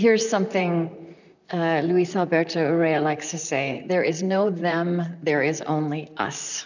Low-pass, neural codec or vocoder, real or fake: 7.2 kHz; vocoder, 44.1 kHz, 128 mel bands, Pupu-Vocoder; fake